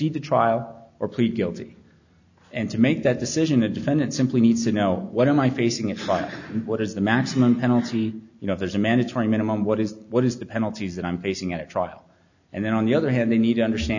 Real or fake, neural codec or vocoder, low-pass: real; none; 7.2 kHz